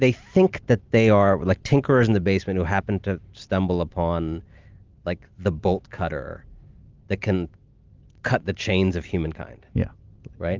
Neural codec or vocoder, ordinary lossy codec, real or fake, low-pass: none; Opus, 24 kbps; real; 7.2 kHz